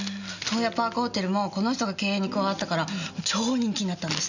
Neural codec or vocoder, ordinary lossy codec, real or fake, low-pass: none; none; real; 7.2 kHz